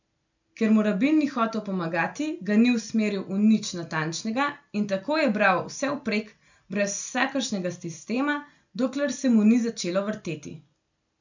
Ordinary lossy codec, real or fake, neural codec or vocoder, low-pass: none; real; none; 7.2 kHz